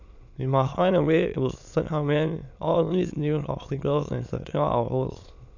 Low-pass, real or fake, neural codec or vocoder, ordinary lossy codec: 7.2 kHz; fake; autoencoder, 22.05 kHz, a latent of 192 numbers a frame, VITS, trained on many speakers; none